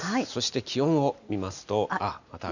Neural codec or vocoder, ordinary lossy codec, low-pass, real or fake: none; none; 7.2 kHz; real